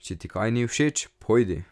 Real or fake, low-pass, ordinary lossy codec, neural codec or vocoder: real; none; none; none